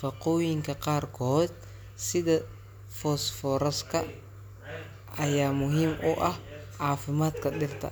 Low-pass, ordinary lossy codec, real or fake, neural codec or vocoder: none; none; real; none